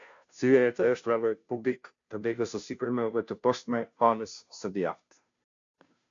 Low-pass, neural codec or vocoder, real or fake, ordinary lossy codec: 7.2 kHz; codec, 16 kHz, 0.5 kbps, FunCodec, trained on Chinese and English, 25 frames a second; fake; MP3, 64 kbps